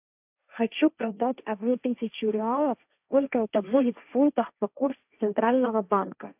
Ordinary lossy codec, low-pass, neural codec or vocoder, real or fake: AAC, 32 kbps; 3.6 kHz; codec, 16 kHz, 1.1 kbps, Voila-Tokenizer; fake